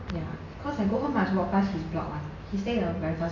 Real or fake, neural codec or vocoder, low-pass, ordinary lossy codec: real; none; 7.2 kHz; none